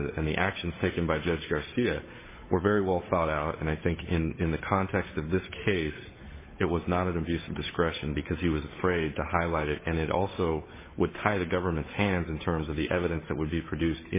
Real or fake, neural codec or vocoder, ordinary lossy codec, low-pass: fake; codec, 16 kHz, 8 kbps, FunCodec, trained on Chinese and English, 25 frames a second; MP3, 16 kbps; 3.6 kHz